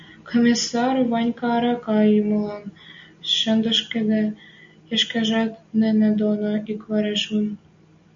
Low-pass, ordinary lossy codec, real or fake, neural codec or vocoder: 7.2 kHz; MP3, 48 kbps; real; none